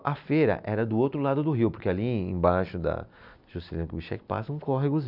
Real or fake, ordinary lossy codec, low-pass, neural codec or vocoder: real; none; 5.4 kHz; none